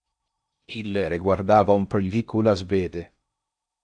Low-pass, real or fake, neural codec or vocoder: 9.9 kHz; fake; codec, 16 kHz in and 24 kHz out, 0.6 kbps, FocalCodec, streaming, 4096 codes